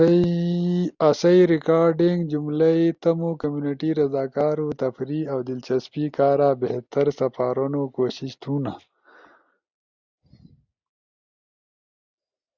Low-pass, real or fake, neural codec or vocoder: 7.2 kHz; real; none